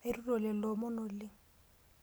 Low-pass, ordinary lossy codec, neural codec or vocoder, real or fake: none; none; none; real